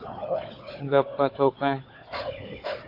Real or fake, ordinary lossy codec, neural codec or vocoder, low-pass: fake; AAC, 32 kbps; codec, 16 kHz, 4 kbps, FunCodec, trained on Chinese and English, 50 frames a second; 5.4 kHz